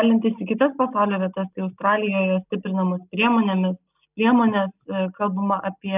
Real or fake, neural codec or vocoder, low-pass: real; none; 3.6 kHz